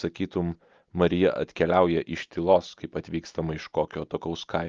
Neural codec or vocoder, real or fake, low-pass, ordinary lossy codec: none; real; 7.2 kHz; Opus, 32 kbps